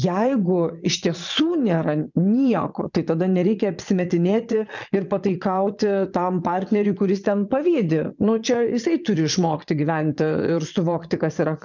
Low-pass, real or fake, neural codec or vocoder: 7.2 kHz; fake; vocoder, 22.05 kHz, 80 mel bands, Vocos